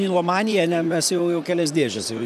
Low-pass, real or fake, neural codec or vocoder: 14.4 kHz; fake; vocoder, 44.1 kHz, 128 mel bands, Pupu-Vocoder